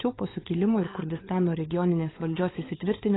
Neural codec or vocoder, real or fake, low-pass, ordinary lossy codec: codec, 16 kHz, 16 kbps, FunCodec, trained on LibriTTS, 50 frames a second; fake; 7.2 kHz; AAC, 16 kbps